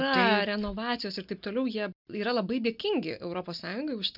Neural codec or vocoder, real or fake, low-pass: none; real; 5.4 kHz